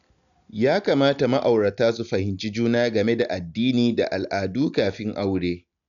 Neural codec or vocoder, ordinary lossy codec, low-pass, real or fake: none; none; 7.2 kHz; real